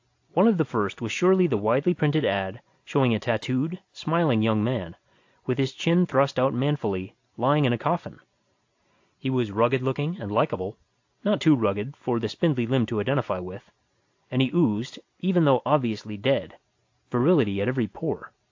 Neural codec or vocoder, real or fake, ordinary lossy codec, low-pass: none; real; AAC, 48 kbps; 7.2 kHz